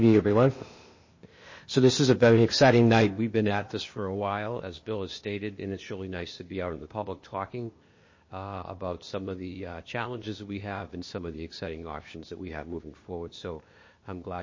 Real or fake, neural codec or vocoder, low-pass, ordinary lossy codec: fake; codec, 16 kHz, 0.8 kbps, ZipCodec; 7.2 kHz; MP3, 32 kbps